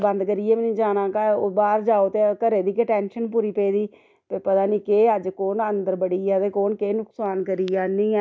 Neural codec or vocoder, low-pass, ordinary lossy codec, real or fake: none; none; none; real